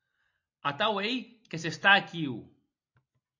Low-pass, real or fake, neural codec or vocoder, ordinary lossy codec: 7.2 kHz; real; none; MP3, 48 kbps